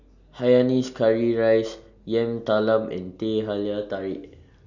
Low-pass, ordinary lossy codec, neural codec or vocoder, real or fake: 7.2 kHz; none; none; real